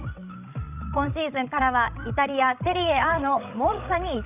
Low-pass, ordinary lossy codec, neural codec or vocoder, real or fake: 3.6 kHz; none; codec, 16 kHz, 16 kbps, FreqCodec, larger model; fake